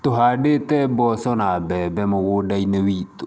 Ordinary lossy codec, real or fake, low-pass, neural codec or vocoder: none; real; none; none